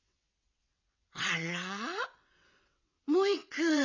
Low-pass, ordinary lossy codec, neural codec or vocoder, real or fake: 7.2 kHz; none; vocoder, 22.05 kHz, 80 mel bands, WaveNeXt; fake